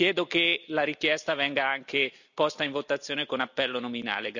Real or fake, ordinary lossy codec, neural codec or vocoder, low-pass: real; none; none; 7.2 kHz